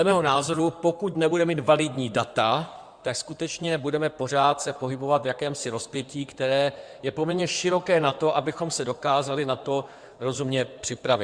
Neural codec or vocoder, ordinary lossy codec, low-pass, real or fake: codec, 16 kHz in and 24 kHz out, 2.2 kbps, FireRedTTS-2 codec; Opus, 64 kbps; 9.9 kHz; fake